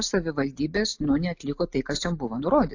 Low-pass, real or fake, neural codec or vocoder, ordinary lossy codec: 7.2 kHz; real; none; AAC, 48 kbps